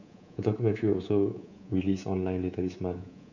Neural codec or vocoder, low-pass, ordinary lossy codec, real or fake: codec, 24 kHz, 3.1 kbps, DualCodec; 7.2 kHz; none; fake